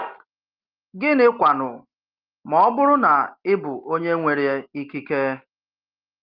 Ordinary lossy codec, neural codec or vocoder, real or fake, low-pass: Opus, 32 kbps; none; real; 5.4 kHz